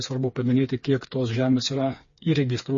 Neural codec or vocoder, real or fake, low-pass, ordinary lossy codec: codec, 16 kHz, 4 kbps, FreqCodec, smaller model; fake; 7.2 kHz; MP3, 32 kbps